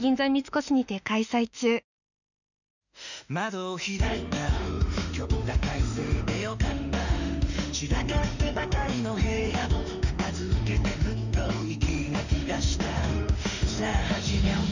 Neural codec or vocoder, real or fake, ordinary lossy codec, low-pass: autoencoder, 48 kHz, 32 numbers a frame, DAC-VAE, trained on Japanese speech; fake; none; 7.2 kHz